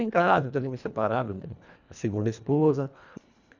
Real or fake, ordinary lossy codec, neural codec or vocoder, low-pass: fake; none; codec, 24 kHz, 1.5 kbps, HILCodec; 7.2 kHz